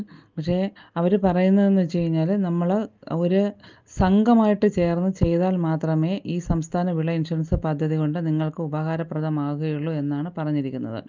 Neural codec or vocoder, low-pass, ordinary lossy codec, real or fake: none; 7.2 kHz; Opus, 32 kbps; real